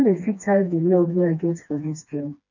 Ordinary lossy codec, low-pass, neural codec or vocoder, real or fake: none; 7.2 kHz; codec, 16 kHz, 2 kbps, FreqCodec, smaller model; fake